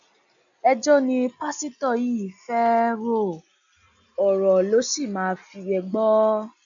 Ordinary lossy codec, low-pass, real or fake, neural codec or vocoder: none; 7.2 kHz; real; none